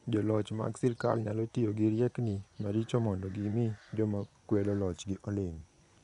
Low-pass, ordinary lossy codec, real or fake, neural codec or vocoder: 10.8 kHz; none; fake; vocoder, 24 kHz, 100 mel bands, Vocos